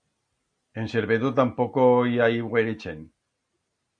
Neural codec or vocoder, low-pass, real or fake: none; 9.9 kHz; real